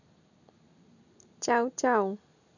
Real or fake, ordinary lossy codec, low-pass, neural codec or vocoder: real; none; 7.2 kHz; none